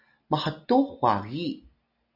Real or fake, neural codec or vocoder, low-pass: real; none; 5.4 kHz